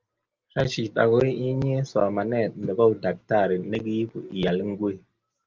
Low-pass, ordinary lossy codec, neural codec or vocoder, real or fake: 7.2 kHz; Opus, 32 kbps; none; real